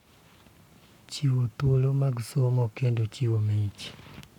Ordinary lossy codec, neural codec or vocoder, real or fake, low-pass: none; codec, 44.1 kHz, 7.8 kbps, Pupu-Codec; fake; 19.8 kHz